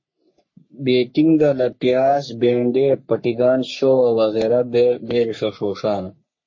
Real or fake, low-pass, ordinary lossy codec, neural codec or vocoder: fake; 7.2 kHz; MP3, 32 kbps; codec, 44.1 kHz, 3.4 kbps, Pupu-Codec